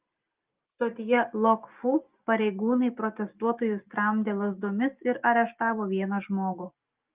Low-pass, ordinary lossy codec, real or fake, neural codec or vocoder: 3.6 kHz; Opus, 24 kbps; real; none